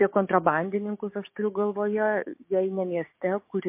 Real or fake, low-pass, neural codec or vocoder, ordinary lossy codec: fake; 3.6 kHz; codec, 16 kHz, 6 kbps, DAC; MP3, 24 kbps